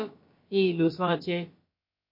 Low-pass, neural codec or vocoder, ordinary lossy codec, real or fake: 5.4 kHz; codec, 16 kHz, about 1 kbps, DyCAST, with the encoder's durations; MP3, 32 kbps; fake